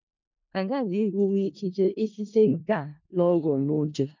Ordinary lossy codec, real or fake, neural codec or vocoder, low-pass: MP3, 48 kbps; fake; codec, 16 kHz in and 24 kHz out, 0.4 kbps, LongCat-Audio-Codec, four codebook decoder; 7.2 kHz